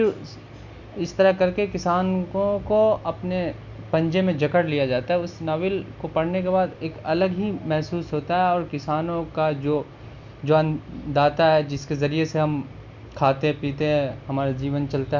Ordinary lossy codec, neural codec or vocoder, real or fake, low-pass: none; none; real; 7.2 kHz